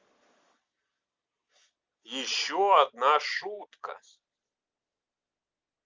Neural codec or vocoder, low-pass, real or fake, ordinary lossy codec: none; 7.2 kHz; real; Opus, 32 kbps